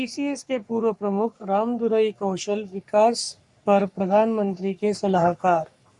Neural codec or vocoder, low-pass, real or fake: codec, 44.1 kHz, 3.4 kbps, Pupu-Codec; 10.8 kHz; fake